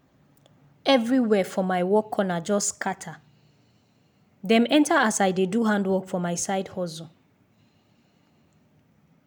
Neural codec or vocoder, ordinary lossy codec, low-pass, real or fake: none; none; none; real